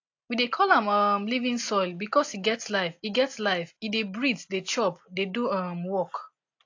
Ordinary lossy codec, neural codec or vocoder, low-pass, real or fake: AAC, 48 kbps; none; 7.2 kHz; real